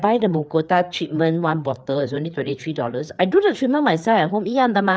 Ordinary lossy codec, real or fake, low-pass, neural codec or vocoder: none; fake; none; codec, 16 kHz, 4 kbps, FreqCodec, larger model